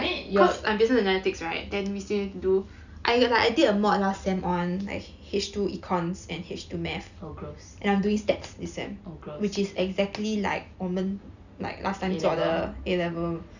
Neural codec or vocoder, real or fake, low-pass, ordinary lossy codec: none; real; 7.2 kHz; none